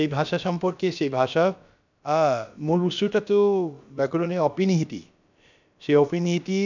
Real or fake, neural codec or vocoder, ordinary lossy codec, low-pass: fake; codec, 16 kHz, about 1 kbps, DyCAST, with the encoder's durations; none; 7.2 kHz